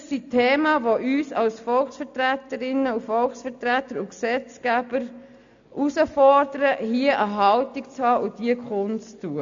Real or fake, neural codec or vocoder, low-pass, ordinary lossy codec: real; none; 7.2 kHz; AAC, 64 kbps